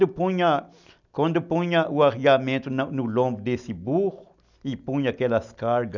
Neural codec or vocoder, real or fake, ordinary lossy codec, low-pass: none; real; none; 7.2 kHz